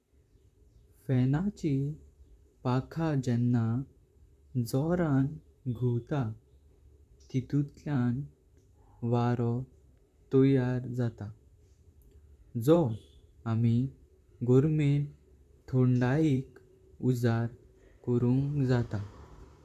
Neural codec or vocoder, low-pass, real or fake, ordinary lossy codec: vocoder, 44.1 kHz, 128 mel bands, Pupu-Vocoder; 14.4 kHz; fake; none